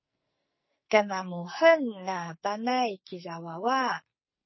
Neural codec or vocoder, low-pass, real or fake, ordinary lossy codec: codec, 44.1 kHz, 2.6 kbps, SNAC; 7.2 kHz; fake; MP3, 24 kbps